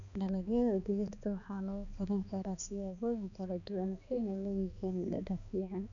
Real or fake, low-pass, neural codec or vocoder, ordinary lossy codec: fake; 7.2 kHz; codec, 16 kHz, 2 kbps, X-Codec, HuBERT features, trained on balanced general audio; none